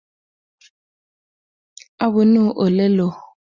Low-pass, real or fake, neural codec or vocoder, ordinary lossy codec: 7.2 kHz; real; none; Opus, 64 kbps